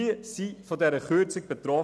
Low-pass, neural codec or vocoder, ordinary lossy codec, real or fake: none; none; none; real